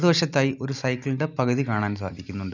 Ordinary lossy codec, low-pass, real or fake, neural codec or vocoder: none; 7.2 kHz; real; none